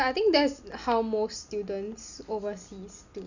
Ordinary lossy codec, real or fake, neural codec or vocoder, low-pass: none; real; none; 7.2 kHz